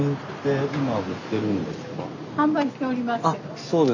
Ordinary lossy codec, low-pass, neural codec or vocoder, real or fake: none; 7.2 kHz; none; real